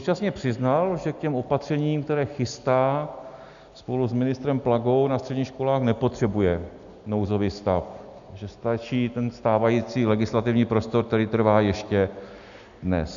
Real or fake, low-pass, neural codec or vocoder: real; 7.2 kHz; none